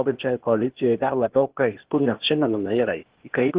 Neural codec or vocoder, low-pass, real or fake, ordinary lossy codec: codec, 16 kHz, 0.8 kbps, ZipCodec; 3.6 kHz; fake; Opus, 16 kbps